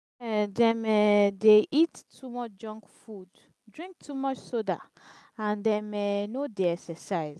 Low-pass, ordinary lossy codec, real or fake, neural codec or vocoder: none; none; real; none